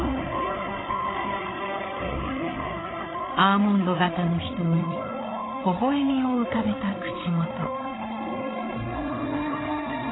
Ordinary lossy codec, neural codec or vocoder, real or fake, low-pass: AAC, 16 kbps; codec, 16 kHz, 8 kbps, FreqCodec, larger model; fake; 7.2 kHz